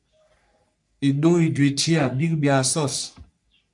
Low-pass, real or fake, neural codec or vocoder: 10.8 kHz; fake; codec, 44.1 kHz, 3.4 kbps, Pupu-Codec